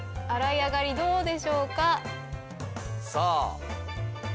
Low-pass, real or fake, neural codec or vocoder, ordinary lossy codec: none; real; none; none